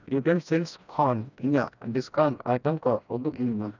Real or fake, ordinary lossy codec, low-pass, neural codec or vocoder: fake; none; 7.2 kHz; codec, 16 kHz, 1 kbps, FreqCodec, smaller model